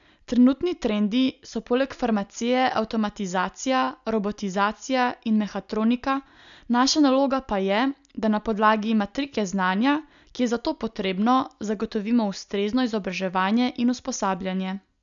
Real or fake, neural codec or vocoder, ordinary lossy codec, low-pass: real; none; none; 7.2 kHz